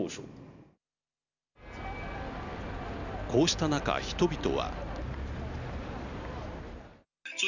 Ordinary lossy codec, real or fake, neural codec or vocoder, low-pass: none; real; none; 7.2 kHz